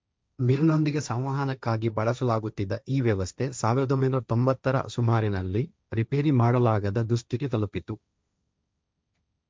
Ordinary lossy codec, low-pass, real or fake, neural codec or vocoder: none; none; fake; codec, 16 kHz, 1.1 kbps, Voila-Tokenizer